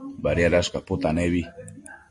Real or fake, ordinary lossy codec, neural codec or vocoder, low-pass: real; MP3, 48 kbps; none; 10.8 kHz